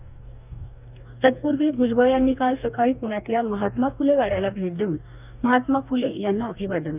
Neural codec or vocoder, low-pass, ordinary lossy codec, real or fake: codec, 44.1 kHz, 2.6 kbps, DAC; 3.6 kHz; none; fake